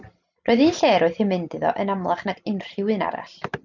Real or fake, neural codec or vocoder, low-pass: real; none; 7.2 kHz